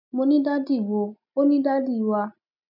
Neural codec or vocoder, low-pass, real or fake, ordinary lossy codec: none; 5.4 kHz; real; none